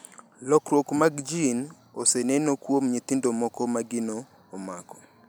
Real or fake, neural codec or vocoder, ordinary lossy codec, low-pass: real; none; none; none